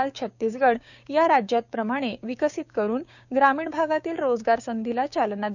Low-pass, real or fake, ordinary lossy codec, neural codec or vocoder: 7.2 kHz; fake; none; codec, 16 kHz, 6 kbps, DAC